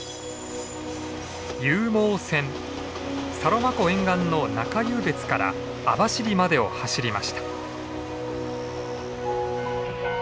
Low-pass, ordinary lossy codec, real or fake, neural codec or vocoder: none; none; real; none